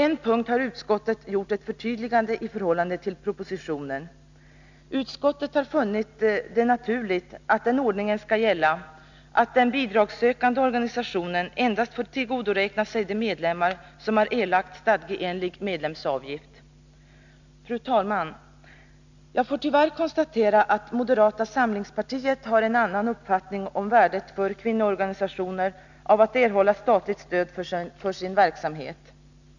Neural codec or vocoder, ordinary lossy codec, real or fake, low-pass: none; none; real; 7.2 kHz